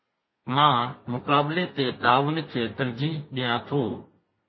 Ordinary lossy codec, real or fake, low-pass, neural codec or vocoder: MP3, 24 kbps; fake; 7.2 kHz; codec, 32 kHz, 1.9 kbps, SNAC